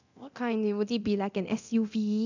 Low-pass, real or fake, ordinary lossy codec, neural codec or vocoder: 7.2 kHz; fake; none; codec, 24 kHz, 0.9 kbps, DualCodec